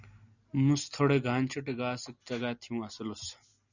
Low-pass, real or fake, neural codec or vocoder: 7.2 kHz; real; none